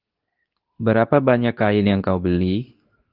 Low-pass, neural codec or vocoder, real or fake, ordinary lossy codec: 5.4 kHz; codec, 24 kHz, 0.9 kbps, WavTokenizer, medium speech release version 2; fake; Opus, 24 kbps